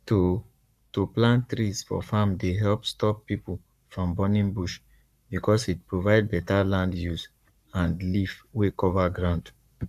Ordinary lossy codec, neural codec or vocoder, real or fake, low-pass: none; codec, 44.1 kHz, 7.8 kbps, Pupu-Codec; fake; 14.4 kHz